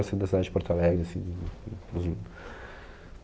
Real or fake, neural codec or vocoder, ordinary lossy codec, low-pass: real; none; none; none